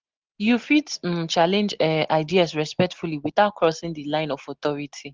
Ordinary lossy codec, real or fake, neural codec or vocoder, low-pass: Opus, 16 kbps; real; none; 7.2 kHz